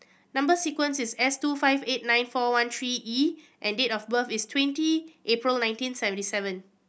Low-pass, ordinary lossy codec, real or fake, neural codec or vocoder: none; none; real; none